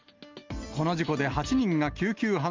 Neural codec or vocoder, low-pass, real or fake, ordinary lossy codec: none; 7.2 kHz; real; Opus, 32 kbps